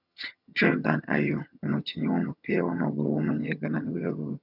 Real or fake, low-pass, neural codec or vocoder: fake; 5.4 kHz; vocoder, 22.05 kHz, 80 mel bands, HiFi-GAN